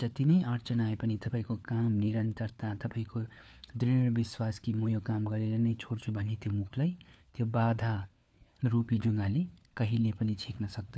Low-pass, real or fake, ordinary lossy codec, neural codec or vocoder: none; fake; none; codec, 16 kHz, 4 kbps, FunCodec, trained on LibriTTS, 50 frames a second